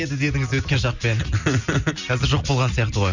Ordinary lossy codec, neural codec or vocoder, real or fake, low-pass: none; none; real; 7.2 kHz